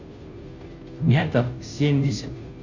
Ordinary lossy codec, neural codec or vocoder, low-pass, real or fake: none; codec, 16 kHz, 0.5 kbps, FunCodec, trained on Chinese and English, 25 frames a second; 7.2 kHz; fake